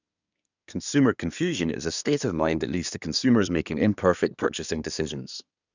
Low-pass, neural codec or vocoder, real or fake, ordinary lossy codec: 7.2 kHz; codec, 24 kHz, 1 kbps, SNAC; fake; none